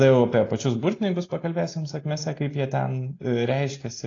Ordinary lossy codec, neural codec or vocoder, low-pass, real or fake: AAC, 32 kbps; none; 7.2 kHz; real